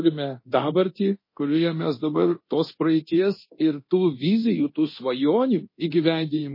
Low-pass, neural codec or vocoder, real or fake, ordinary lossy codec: 5.4 kHz; codec, 24 kHz, 0.9 kbps, DualCodec; fake; MP3, 24 kbps